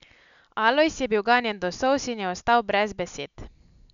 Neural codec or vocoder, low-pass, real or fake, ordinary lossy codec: none; 7.2 kHz; real; none